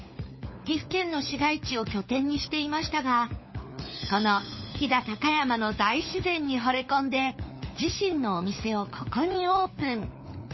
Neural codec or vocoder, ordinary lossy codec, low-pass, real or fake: codec, 16 kHz, 4 kbps, FunCodec, trained on LibriTTS, 50 frames a second; MP3, 24 kbps; 7.2 kHz; fake